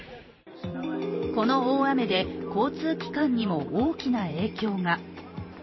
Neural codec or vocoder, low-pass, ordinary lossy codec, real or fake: vocoder, 44.1 kHz, 128 mel bands every 512 samples, BigVGAN v2; 7.2 kHz; MP3, 24 kbps; fake